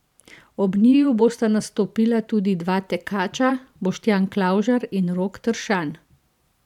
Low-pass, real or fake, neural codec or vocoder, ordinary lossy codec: 19.8 kHz; fake; vocoder, 44.1 kHz, 128 mel bands every 512 samples, BigVGAN v2; none